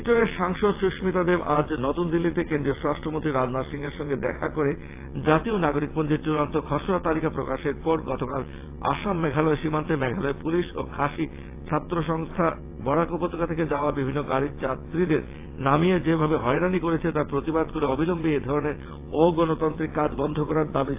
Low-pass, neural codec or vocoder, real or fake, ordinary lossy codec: 3.6 kHz; vocoder, 22.05 kHz, 80 mel bands, WaveNeXt; fake; AAC, 24 kbps